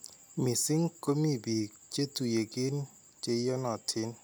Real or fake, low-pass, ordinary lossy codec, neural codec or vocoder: real; none; none; none